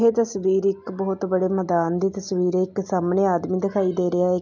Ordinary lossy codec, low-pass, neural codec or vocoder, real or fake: none; 7.2 kHz; none; real